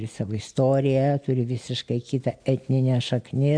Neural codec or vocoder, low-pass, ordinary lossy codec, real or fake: none; 9.9 kHz; MP3, 64 kbps; real